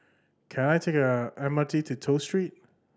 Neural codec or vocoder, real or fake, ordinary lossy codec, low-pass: none; real; none; none